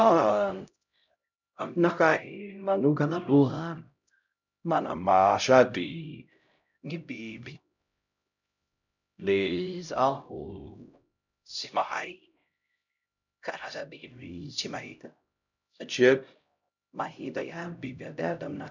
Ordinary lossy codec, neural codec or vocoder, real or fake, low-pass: none; codec, 16 kHz, 0.5 kbps, X-Codec, HuBERT features, trained on LibriSpeech; fake; 7.2 kHz